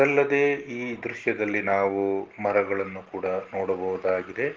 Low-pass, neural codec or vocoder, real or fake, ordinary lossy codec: 7.2 kHz; none; real; Opus, 16 kbps